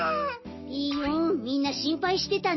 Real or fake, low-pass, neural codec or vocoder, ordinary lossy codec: real; 7.2 kHz; none; MP3, 24 kbps